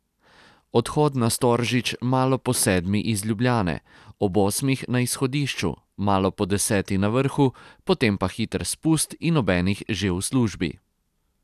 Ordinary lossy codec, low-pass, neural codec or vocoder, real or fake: none; 14.4 kHz; none; real